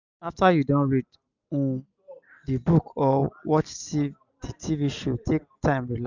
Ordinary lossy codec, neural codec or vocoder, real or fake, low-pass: none; none; real; 7.2 kHz